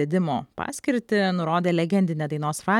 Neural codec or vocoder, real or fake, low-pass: vocoder, 44.1 kHz, 128 mel bands every 256 samples, BigVGAN v2; fake; 19.8 kHz